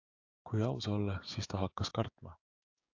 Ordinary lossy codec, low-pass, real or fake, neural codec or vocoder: AAC, 48 kbps; 7.2 kHz; fake; codec, 16 kHz, 6 kbps, DAC